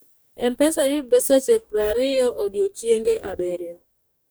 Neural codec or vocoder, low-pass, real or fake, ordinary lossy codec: codec, 44.1 kHz, 2.6 kbps, DAC; none; fake; none